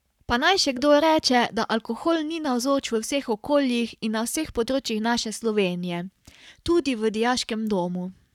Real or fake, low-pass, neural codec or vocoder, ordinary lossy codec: fake; 19.8 kHz; codec, 44.1 kHz, 7.8 kbps, Pupu-Codec; none